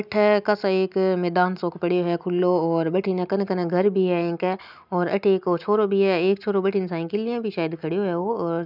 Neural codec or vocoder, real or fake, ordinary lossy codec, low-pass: none; real; none; 5.4 kHz